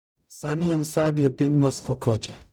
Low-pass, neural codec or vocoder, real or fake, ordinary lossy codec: none; codec, 44.1 kHz, 0.9 kbps, DAC; fake; none